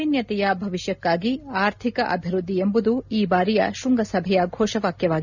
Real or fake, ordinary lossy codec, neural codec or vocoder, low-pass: real; none; none; 7.2 kHz